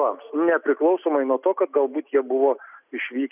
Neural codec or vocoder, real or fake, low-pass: none; real; 3.6 kHz